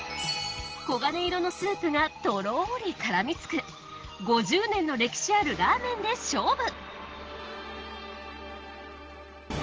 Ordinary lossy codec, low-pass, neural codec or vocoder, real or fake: Opus, 16 kbps; 7.2 kHz; none; real